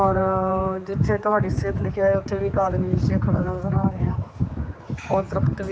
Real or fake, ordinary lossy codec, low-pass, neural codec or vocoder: fake; none; none; codec, 16 kHz, 4 kbps, X-Codec, HuBERT features, trained on general audio